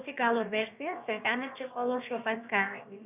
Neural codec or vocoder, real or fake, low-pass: codec, 16 kHz, about 1 kbps, DyCAST, with the encoder's durations; fake; 3.6 kHz